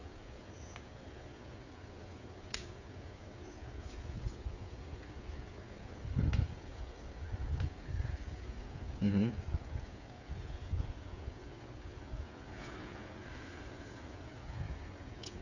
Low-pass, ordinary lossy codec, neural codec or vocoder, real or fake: 7.2 kHz; none; codec, 16 kHz, 8 kbps, FreqCodec, smaller model; fake